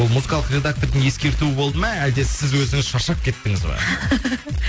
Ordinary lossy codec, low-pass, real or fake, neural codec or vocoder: none; none; real; none